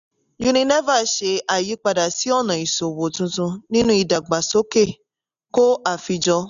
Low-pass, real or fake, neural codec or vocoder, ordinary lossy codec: 7.2 kHz; real; none; none